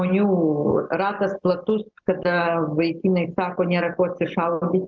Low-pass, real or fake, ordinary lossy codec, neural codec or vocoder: 7.2 kHz; real; Opus, 32 kbps; none